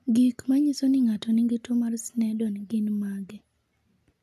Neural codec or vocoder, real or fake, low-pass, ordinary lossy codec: none; real; 14.4 kHz; none